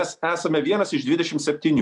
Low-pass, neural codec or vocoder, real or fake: 10.8 kHz; none; real